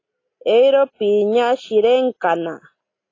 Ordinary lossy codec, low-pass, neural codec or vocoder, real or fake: AAC, 32 kbps; 7.2 kHz; none; real